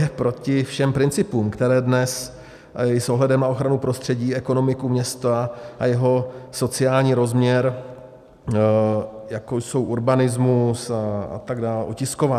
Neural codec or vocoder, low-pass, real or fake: none; 14.4 kHz; real